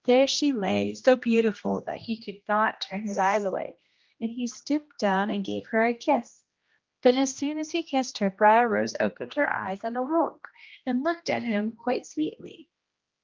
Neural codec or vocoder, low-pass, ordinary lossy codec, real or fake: codec, 16 kHz, 1 kbps, X-Codec, HuBERT features, trained on general audio; 7.2 kHz; Opus, 32 kbps; fake